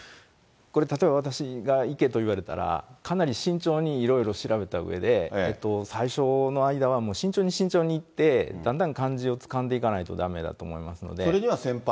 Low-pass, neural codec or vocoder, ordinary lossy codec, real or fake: none; none; none; real